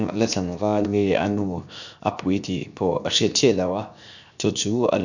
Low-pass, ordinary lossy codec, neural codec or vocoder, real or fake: 7.2 kHz; none; codec, 16 kHz, 0.7 kbps, FocalCodec; fake